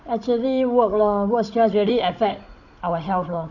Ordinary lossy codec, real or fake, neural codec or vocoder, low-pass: none; fake; codec, 16 kHz, 16 kbps, FunCodec, trained on Chinese and English, 50 frames a second; 7.2 kHz